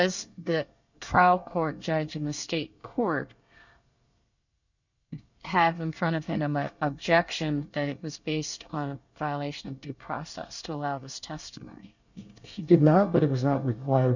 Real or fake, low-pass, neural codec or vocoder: fake; 7.2 kHz; codec, 24 kHz, 1 kbps, SNAC